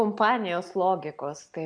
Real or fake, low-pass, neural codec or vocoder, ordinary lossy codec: real; 9.9 kHz; none; MP3, 64 kbps